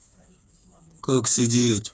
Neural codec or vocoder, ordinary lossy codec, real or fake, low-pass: codec, 16 kHz, 4 kbps, FreqCodec, smaller model; none; fake; none